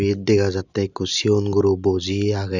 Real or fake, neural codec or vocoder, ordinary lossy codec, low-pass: real; none; none; 7.2 kHz